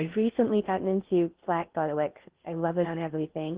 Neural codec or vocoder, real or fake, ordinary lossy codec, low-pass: codec, 16 kHz in and 24 kHz out, 0.6 kbps, FocalCodec, streaming, 2048 codes; fake; Opus, 16 kbps; 3.6 kHz